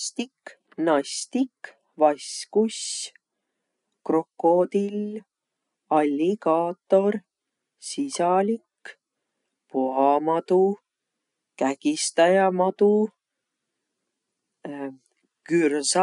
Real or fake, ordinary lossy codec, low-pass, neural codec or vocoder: real; none; 10.8 kHz; none